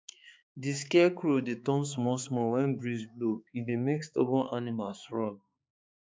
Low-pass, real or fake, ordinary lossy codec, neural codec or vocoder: none; fake; none; codec, 16 kHz, 4 kbps, X-Codec, HuBERT features, trained on balanced general audio